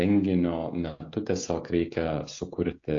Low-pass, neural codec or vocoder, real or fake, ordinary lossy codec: 7.2 kHz; codec, 16 kHz, 16 kbps, FreqCodec, smaller model; fake; AAC, 48 kbps